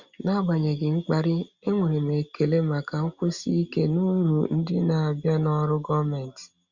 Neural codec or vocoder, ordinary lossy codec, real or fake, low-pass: none; Opus, 64 kbps; real; 7.2 kHz